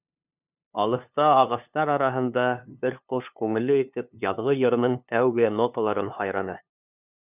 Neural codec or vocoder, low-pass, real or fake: codec, 16 kHz, 2 kbps, FunCodec, trained on LibriTTS, 25 frames a second; 3.6 kHz; fake